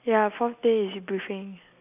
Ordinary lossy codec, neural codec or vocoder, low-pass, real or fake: none; none; 3.6 kHz; real